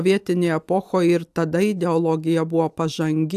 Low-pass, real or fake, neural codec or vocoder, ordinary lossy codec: 14.4 kHz; real; none; AAC, 96 kbps